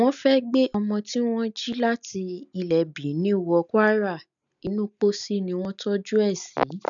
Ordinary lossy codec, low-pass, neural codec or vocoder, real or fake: none; 7.2 kHz; none; real